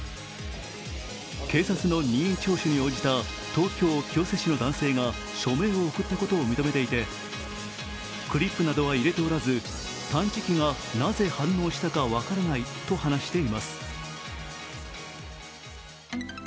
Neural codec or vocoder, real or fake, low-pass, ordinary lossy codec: none; real; none; none